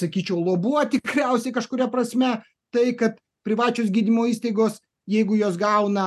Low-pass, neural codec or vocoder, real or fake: 14.4 kHz; none; real